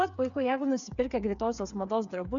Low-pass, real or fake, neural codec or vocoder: 7.2 kHz; fake; codec, 16 kHz, 8 kbps, FreqCodec, smaller model